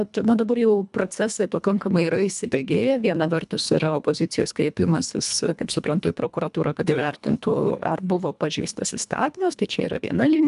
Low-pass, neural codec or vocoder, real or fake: 10.8 kHz; codec, 24 kHz, 1.5 kbps, HILCodec; fake